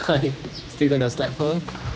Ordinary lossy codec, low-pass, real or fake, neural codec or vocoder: none; none; fake; codec, 16 kHz, 2 kbps, X-Codec, HuBERT features, trained on general audio